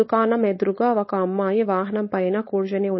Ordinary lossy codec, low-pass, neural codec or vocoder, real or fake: MP3, 24 kbps; 7.2 kHz; codec, 16 kHz, 4.8 kbps, FACodec; fake